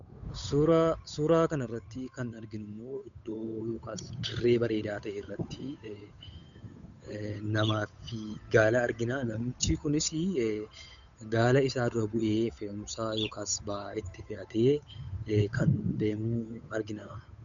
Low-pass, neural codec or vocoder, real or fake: 7.2 kHz; codec, 16 kHz, 8 kbps, FunCodec, trained on Chinese and English, 25 frames a second; fake